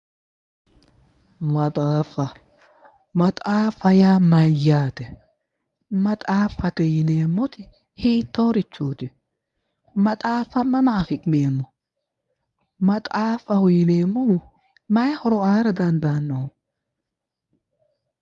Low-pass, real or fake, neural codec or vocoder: 10.8 kHz; fake; codec, 24 kHz, 0.9 kbps, WavTokenizer, medium speech release version 1